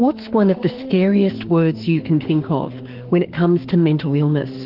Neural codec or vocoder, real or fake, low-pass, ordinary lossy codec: autoencoder, 48 kHz, 32 numbers a frame, DAC-VAE, trained on Japanese speech; fake; 5.4 kHz; Opus, 16 kbps